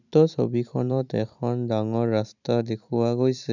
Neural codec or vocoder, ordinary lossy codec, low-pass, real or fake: vocoder, 44.1 kHz, 128 mel bands every 256 samples, BigVGAN v2; none; 7.2 kHz; fake